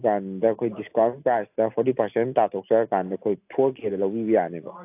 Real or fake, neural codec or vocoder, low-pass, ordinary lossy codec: real; none; 3.6 kHz; AAC, 32 kbps